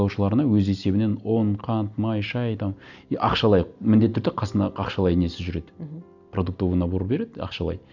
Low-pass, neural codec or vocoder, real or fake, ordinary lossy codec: 7.2 kHz; none; real; none